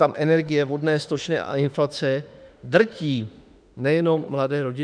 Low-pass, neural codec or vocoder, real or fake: 9.9 kHz; autoencoder, 48 kHz, 32 numbers a frame, DAC-VAE, trained on Japanese speech; fake